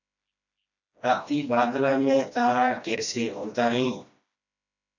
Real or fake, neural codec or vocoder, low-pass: fake; codec, 16 kHz, 1 kbps, FreqCodec, smaller model; 7.2 kHz